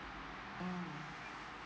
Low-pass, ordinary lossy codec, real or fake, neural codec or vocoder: none; none; real; none